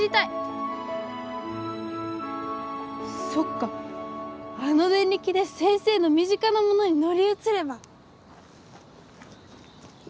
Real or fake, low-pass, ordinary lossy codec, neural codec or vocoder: real; none; none; none